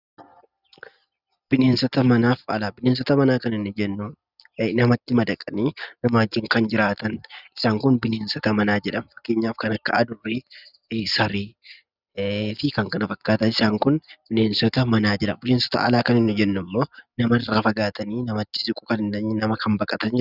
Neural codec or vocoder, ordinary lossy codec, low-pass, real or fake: none; Opus, 64 kbps; 5.4 kHz; real